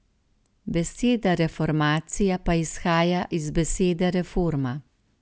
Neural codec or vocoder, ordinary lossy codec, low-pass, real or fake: none; none; none; real